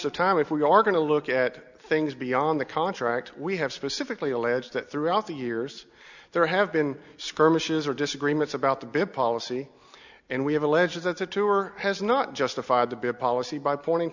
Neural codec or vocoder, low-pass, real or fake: none; 7.2 kHz; real